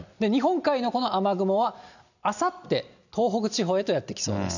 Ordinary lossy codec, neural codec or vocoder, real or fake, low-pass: none; none; real; 7.2 kHz